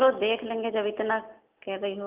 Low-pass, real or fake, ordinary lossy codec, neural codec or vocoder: 3.6 kHz; real; Opus, 32 kbps; none